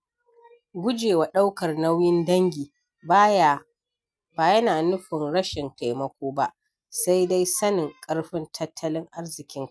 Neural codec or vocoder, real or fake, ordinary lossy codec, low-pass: none; real; none; none